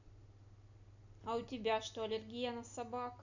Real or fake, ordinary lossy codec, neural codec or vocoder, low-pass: real; none; none; 7.2 kHz